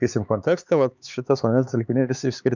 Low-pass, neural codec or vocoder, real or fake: 7.2 kHz; codec, 16 kHz, 4 kbps, X-Codec, HuBERT features, trained on LibriSpeech; fake